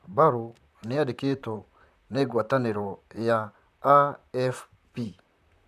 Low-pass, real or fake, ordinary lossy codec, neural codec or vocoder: 14.4 kHz; fake; none; vocoder, 44.1 kHz, 128 mel bands, Pupu-Vocoder